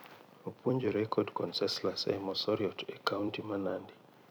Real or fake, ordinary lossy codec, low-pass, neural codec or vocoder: fake; none; none; vocoder, 44.1 kHz, 128 mel bands every 256 samples, BigVGAN v2